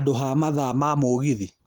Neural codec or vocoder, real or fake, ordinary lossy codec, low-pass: none; real; Opus, 32 kbps; 19.8 kHz